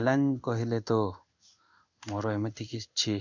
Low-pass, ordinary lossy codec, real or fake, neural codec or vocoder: 7.2 kHz; none; fake; codec, 16 kHz in and 24 kHz out, 1 kbps, XY-Tokenizer